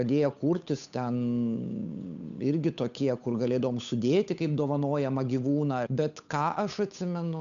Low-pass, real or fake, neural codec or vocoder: 7.2 kHz; fake; codec, 16 kHz, 8 kbps, FunCodec, trained on Chinese and English, 25 frames a second